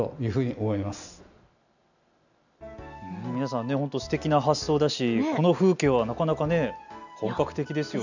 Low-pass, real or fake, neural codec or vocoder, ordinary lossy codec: 7.2 kHz; real; none; none